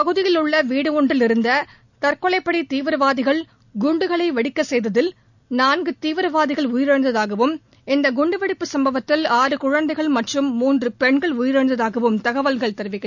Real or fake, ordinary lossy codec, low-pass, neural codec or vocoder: real; none; 7.2 kHz; none